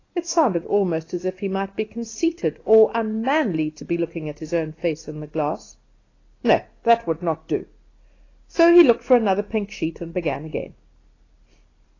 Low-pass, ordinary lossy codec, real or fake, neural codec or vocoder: 7.2 kHz; AAC, 32 kbps; real; none